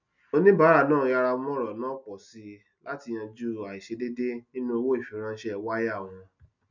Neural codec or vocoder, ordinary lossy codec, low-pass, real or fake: none; none; 7.2 kHz; real